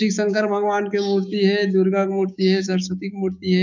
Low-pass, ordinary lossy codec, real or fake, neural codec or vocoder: 7.2 kHz; none; fake; codec, 16 kHz, 6 kbps, DAC